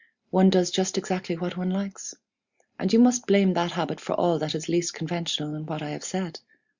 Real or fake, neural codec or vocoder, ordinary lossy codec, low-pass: real; none; Opus, 64 kbps; 7.2 kHz